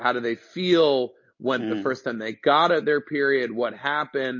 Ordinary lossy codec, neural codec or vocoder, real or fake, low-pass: MP3, 32 kbps; none; real; 7.2 kHz